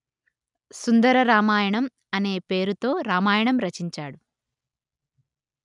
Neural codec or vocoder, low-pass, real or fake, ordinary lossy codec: none; 10.8 kHz; real; none